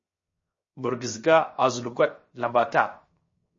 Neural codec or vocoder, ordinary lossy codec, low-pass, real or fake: codec, 16 kHz, 0.7 kbps, FocalCodec; MP3, 32 kbps; 7.2 kHz; fake